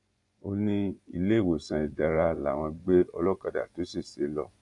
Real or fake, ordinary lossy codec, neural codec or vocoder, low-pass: fake; MP3, 64 kbps; vocoder, 24 kHz, 100 mel bands, Vocos; 10.8 kHz